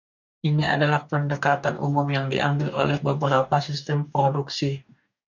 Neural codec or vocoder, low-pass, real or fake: codec, 44.1 kHz, 2.6 kbps, DAC; 7.2 kHz; fake